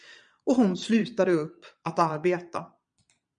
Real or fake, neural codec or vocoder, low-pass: fake; vocoder, 22.05 kHz, 80 mel bands, Vocos; 9.9 kHz